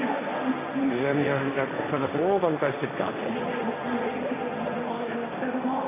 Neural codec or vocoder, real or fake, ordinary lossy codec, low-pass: codec, 16 kHz, 1.1 kbps, Voila-Tokenizer; fake; AAC, 16 kbps; 3.6 kHz